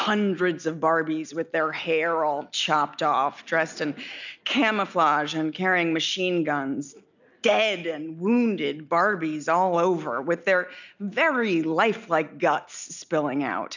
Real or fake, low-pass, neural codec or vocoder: real; 7.2 kHz; none